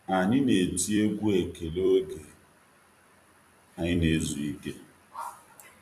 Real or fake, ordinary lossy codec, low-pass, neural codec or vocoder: fake; none; 14.4 kHz; vocoder, 44.1 kHz, 128 mel bands every 256 samples, BigVGAN v2